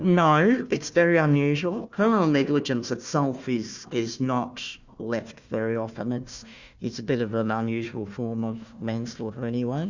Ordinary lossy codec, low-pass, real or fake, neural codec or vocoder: Opus, 64 kbps; 7.2 kHz; fake; codec, 16 kHz, 1 kbps, FunCodec, trained on Chinese and English, 50 frames a second